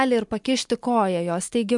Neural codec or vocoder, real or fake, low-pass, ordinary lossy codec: none; real; 10.8 kHz; MP3, 64 kbps